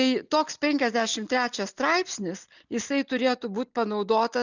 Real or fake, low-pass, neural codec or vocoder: real; 7.2 kHz; none